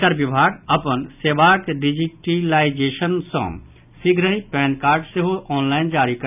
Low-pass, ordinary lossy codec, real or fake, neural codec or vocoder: 3.6 kHz; none; real; none